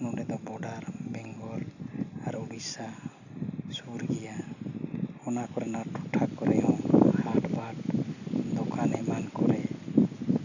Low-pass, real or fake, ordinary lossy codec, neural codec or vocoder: 7.2 kHz; real; none; none